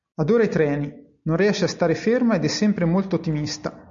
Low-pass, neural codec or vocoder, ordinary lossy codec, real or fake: 7.2 kHz; none; MP3, 64 kbps; real